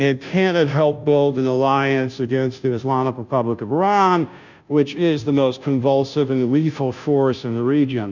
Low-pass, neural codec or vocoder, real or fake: 7.2 kHz; codec, 16 kHz, 0.5 kbps, FunCodec, trained on Chinese and English, 25 frames a second; fake